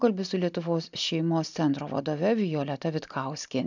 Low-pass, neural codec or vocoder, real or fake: 7.2 kHz; none; real